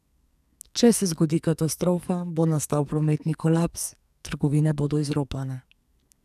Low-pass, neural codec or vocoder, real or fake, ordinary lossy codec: 14.4 kHz; codec, 44.1 kHz, 2.6 kbps, SNAC; fake; none